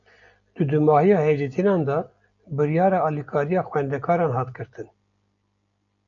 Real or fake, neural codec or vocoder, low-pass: real; none; 7.2 kHz